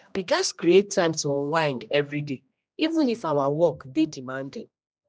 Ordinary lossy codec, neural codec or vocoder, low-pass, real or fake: none; codec, 16 kHz, 1 kbps, X-Codec, HuBERT features, trained on general audio; none; fake